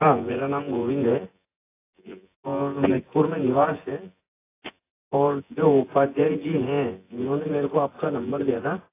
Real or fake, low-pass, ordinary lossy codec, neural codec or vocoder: fake; 3.6 kHz; AAC, 24 kbps; vocoder, 24 kHz, 100 mel bands, Vocos